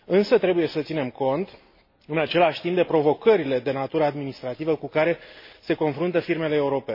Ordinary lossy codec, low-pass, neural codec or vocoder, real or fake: MP3, 24 kbps; 5.4 kHz; none; real